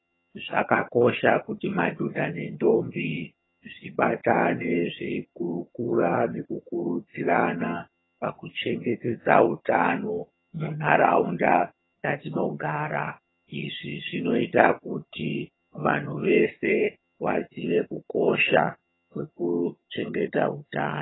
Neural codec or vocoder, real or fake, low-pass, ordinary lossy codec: vocoder, 22.05 kHz, 80 mel bands, HiFi-GAN; fake; 7.2 kHz; AAC, 16 kbps